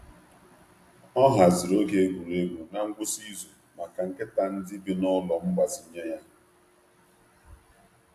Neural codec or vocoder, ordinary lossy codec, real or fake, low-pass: none; AAC, 64 kbps; real; 14.4 kHz